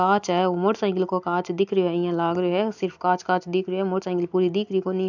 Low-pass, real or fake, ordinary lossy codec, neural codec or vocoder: 7.2 kHz; real; none; none